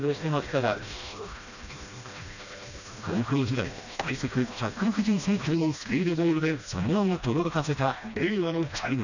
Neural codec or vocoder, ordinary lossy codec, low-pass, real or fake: codec, 16 kHz, 1 kbps, FreqCodec, smaller model; none; 7.2 kHz; fake